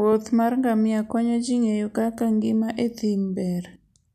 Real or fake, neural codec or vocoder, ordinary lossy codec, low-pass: real; none; MP3, 96 kbps; 14.4 kHz